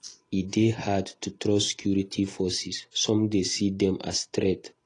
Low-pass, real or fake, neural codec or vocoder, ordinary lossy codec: 10.8 kHz; real; none; AAC, 32 kbps